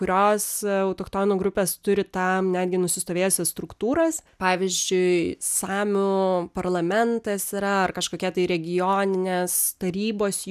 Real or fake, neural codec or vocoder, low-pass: real; none; 14.4 kHz